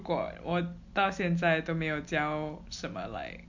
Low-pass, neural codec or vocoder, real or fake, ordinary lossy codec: 7.2 kHz; none; real; MP3, 48 kbps